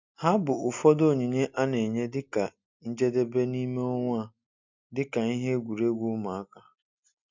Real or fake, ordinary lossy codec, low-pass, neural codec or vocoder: real; MP3, 64 kbps; 7.2 kHz; none